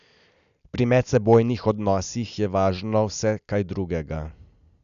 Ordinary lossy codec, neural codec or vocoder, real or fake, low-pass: none; none; real; 7.2 kHz